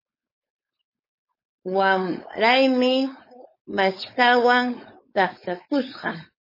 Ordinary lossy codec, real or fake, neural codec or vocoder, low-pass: MP3, 24 kbps; fake; codec, 16 kHz, 4.8 kbps, FACodec; 5.4 kHz